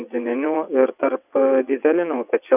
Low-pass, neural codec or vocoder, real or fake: 3.6 kHz; vocoder, 22.05 kHz, 80 mel bands, WaveNeXt; fake